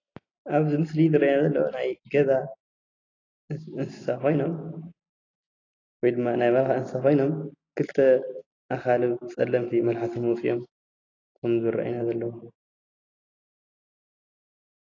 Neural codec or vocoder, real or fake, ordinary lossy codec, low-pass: none; real; AAC, 32 kbps; 7.2 kHz